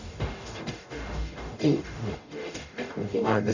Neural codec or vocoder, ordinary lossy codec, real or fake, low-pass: codec, 44.1 kHz, 0.9 kbps, DAC; none; fake; 7.2 kHz